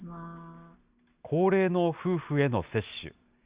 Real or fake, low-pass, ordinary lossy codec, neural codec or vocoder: real; 3.6 kHz; Opus, 64 kbps; none